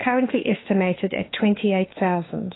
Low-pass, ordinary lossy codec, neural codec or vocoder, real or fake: 7.2 kHz; AAC, 16 kbps; autoencoder, 48 kHz, 32 numbers a frame, DAC-VAE, trained on Japanese speech; fake